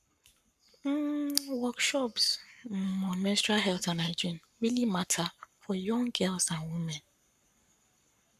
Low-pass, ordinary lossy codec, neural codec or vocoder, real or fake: 14.4 kHz; none; codec, 44.1 kHz, 7.8 kbps, Pupu-Codec; fake